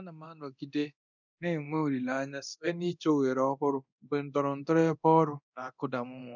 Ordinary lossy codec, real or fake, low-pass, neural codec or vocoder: none; fake; 7.2 kHz; codec, 24 kHz, 0.9 kbps, DualCodec